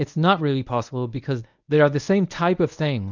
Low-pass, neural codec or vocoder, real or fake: 7.2 kHz; codec, 24 kHz, 0.9 kbps, WavTokenizer, medium speech release version 1; fake